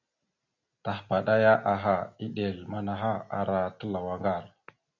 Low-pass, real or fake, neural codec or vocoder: 7.2 kHz; real; none